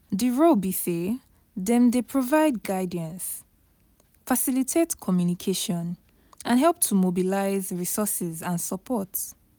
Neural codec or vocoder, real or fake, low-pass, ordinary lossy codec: none; real; none; none